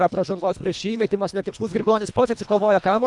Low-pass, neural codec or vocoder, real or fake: 10.8 kHz; codec, 24 kHz, 1.5 kbps, HILCodec; fake